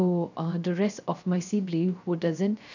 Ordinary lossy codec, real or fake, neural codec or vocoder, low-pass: none; fake; codec, 16 kHz, 0.3 kbps, FocalCodec; 7.2 kHz